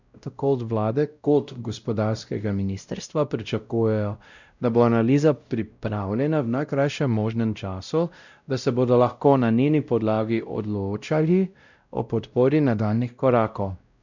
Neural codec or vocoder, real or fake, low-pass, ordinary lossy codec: codec, 16 kHz, 0.5 kbps, X-Codec, WavLM features, trained on Multilingual LibriSpeech; fake; 7.2 kHz; none